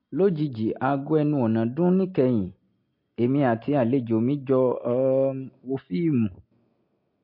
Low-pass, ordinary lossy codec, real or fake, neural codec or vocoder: 5.4 kHz; MP3, 32 kbps; real; none